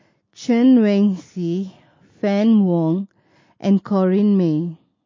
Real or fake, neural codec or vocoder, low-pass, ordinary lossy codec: real; none; 7.2 kHz; MP3, 32 kbps